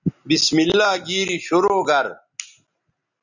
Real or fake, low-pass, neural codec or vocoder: real; 7.2 kHz; none